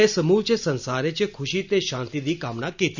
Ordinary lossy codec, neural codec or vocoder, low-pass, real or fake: none; none; 7.2 kHz; real